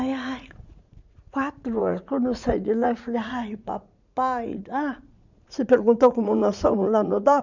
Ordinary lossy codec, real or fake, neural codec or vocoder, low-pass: none; fake; vocoder, 44.1 kHz, 80 mel bands, Vocos; 7.2 kHz